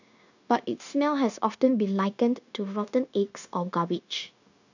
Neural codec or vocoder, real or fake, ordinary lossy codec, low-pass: codec, 16 kHz, 0.9 kbps, LongCat-Audio-Codec; fake; none; 7.2 kHz